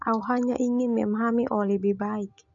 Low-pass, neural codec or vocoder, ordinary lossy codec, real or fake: 7.2 kHz; none; MP3, 64 kbps; real